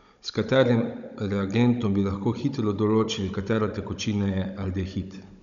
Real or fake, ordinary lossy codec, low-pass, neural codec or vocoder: fake; none; 7.2 kHz; codec, 16 kHz, 16 kbps, FunCodec, trained on Chinese and English, 50 frames a second